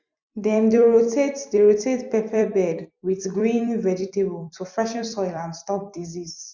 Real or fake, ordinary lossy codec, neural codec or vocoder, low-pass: fake; none; vocoder, 44.1 kHz, 128 mel bands every 256 samples, BigVGAN v2; 7.2 kHz